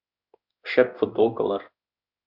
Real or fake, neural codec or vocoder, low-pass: fake; codec, 16 kHz in and 24 kHz out, 1 kbps, XY-Tokenizer; 5.4 kHz